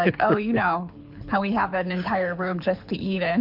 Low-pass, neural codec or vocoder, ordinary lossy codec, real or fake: 5.4 kHz; codec, 24 kHz, 6 kbps, HILCodec; MP3, 48 kbps; fake